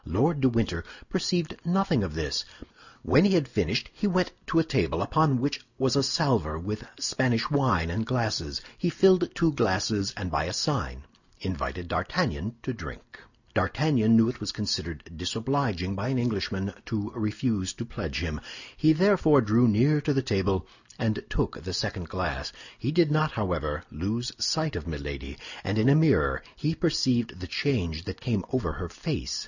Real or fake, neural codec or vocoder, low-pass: real; none; 7.2 kHz